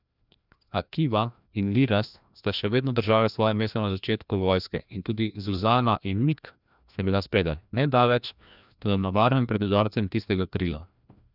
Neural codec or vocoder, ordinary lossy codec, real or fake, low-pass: codec, 16 kHz, 1 kbps, FreqCodec, larger model; none; fake; 5.4 kHz